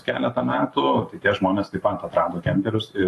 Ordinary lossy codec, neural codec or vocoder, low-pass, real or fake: AAC, 48 kbps; vocoder, 44.1 kHz, 128 mel bands every 512 samples, BigVGAN v2; 14.4 kHz; fake